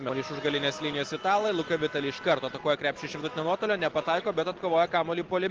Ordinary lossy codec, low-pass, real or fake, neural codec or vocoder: Opus, 16 kbps; 7.2 kHz; real; none